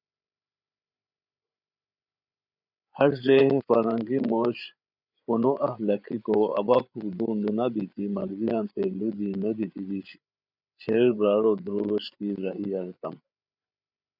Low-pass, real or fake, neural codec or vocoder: 5.4 kHz; fake; codec, 16 kHz, 8 kbps, FreqCodec, larger model